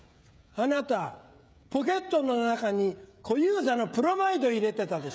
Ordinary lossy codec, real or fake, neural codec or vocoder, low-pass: none; fake; codec, 16 kHz, 16 kbps, FreqCodec, smaller model; none